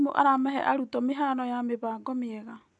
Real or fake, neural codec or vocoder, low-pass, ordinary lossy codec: real; none; 10.8 kHz; none